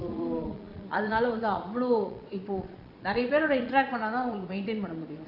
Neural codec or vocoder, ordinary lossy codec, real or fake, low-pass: vocoder, 22.05 kHz, 80 mel bands, Vocos; Opus, 64 kbps; fake; 5.4 kHz